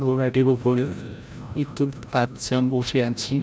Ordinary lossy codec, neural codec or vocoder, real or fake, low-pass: none; codec, 16 kHz, 0.5 kbps, FreqCodec, larger model; fake; none